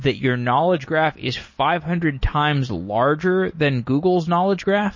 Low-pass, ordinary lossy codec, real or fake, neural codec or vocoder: 7.2 kHz; MP3, 32 kbps; real; none